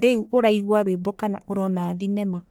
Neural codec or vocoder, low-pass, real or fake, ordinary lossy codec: codec, 44.1 kHz, 1.7 kbps, Pupu-Codec; none; fake; none